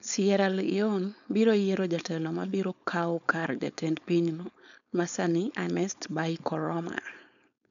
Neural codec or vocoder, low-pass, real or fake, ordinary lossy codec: codec, 16 kHz, 4.8 kbps, FACodec; 7.2 kHz; fake; none